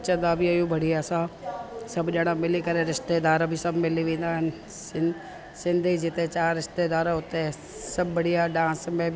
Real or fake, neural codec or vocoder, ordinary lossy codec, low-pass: real; none; none; none